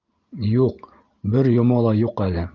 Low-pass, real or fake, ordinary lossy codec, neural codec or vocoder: 7.2 kHz; real; Opus, 32 kbps; none